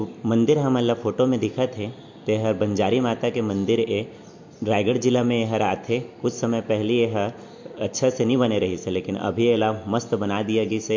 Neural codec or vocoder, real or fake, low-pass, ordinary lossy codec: none; real; 7.2 kHz; MP3, 48 kbps